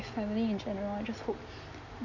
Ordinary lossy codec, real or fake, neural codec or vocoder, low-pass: none; real; none; 7.2 kHz